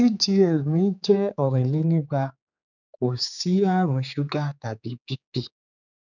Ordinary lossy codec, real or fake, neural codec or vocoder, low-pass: none; fake; codec, 16 kHz, 4 kbps, X-Codec, HuBERT features, trained on general audio; 7.2 kHz